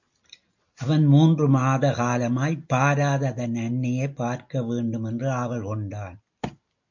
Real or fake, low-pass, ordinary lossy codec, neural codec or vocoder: real; 7.2 kHz; MP3, 48 kbps; none